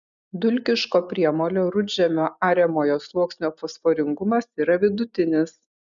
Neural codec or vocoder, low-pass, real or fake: none; 7.2 kHz; real